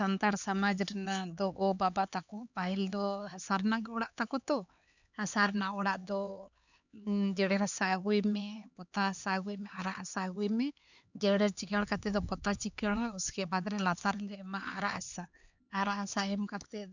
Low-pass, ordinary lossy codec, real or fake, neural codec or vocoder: 7.2 kHz; none; fake; codec, 16 kHz, 4 kbps, X-Codec, HuBERT features, trained on LibriSpeech